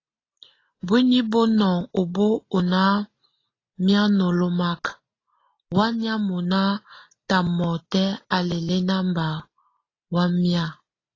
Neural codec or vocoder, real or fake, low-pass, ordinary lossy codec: none; real; 7.2 kHz; AAC, 32 kbps